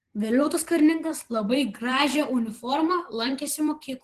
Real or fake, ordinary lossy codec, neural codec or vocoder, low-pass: fake; Opus, 16 kbps; vocoder, 44.1 kHz, 128 mel bands every 512 samples, BigVGAN v2; 14.4 kHz